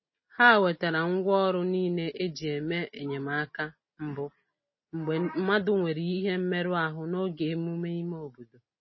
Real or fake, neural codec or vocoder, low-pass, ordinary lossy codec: real; none; 7.2 kHz; MP3, 24 kbps